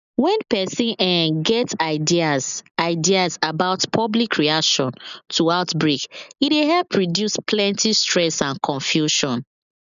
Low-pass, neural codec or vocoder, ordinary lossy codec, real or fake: 7.2 kHz; none; none; real